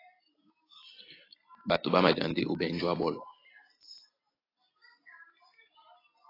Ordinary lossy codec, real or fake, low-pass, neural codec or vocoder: AAC, 24 kbps; real; 5.4 kHz; none